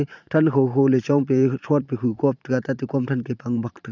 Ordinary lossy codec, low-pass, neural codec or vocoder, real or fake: none; 7.2 kHz; vocoder, 44.1 kHz, 128 mel bands every 512 samples, BigVGAN v2; fake